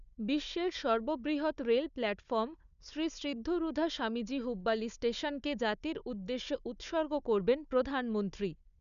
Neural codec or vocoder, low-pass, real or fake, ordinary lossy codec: codec, 16 kHz, 4 kbps, FunCodec, trained on Chinese and English, 50 frames a second; 7.2 kHz; fake; none